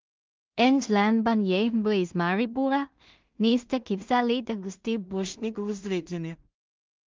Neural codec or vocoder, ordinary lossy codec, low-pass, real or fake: codec, 16 kHz in and 24 kHz out, 0.4 kbps, LongCat-Audio-Codec, two codebook decoder; Opus, 32 kbps; 7.2 kHz; fake